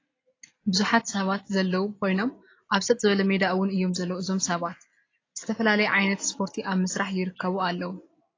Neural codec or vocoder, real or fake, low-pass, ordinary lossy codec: none; real; 7.2 kHz; AAC, 32 kbps